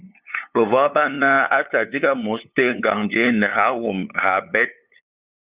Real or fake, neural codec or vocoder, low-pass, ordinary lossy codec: fake; codec, 16 kHz, 8 kbps, FunCodec, trained on LibriTTS, 25 frames a second; 3.6 kHz; Opus, 64 kbps